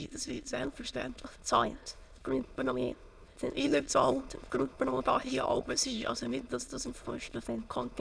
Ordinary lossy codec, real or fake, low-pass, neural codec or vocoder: none; fake; none; autoencoder, 22.05 kHz, a latent of 192 numbers a frame, VITS, trained on many speakers